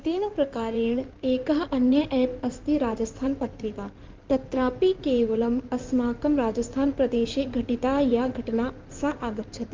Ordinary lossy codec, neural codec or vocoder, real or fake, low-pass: Opus, 24 kbps; codec, 16 kHz in and 24 kHz out, 2.2 kbps, FireRedTTS-2 codec; fake; 7.2 kHz